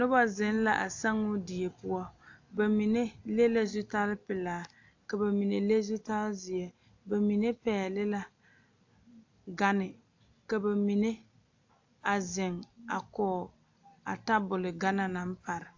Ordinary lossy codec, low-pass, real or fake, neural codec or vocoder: AAC, 48 kbps; 7.2 kHz; real; none